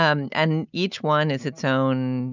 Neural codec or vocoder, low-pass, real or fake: none; 7.2 kHz; real